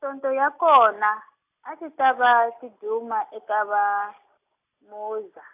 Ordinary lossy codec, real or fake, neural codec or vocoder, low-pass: AAC, 32 kbps; real; none; 3.6 kHz